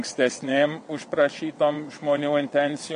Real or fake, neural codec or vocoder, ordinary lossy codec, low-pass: fake; vocoder, 22.05 kHz, 80 mel bands, WaveNeXt; MP3, 48 kbps; 9.9 kHz